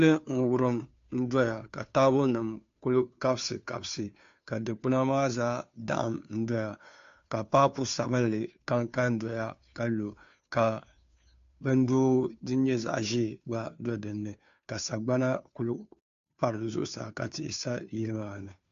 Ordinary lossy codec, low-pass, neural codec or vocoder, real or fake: AAC, 64 kbps; 7.2 kHz; codec, 16 kHz, 2 kbps, FunCodec, trained on Chinese and English, 25 frames a second; fake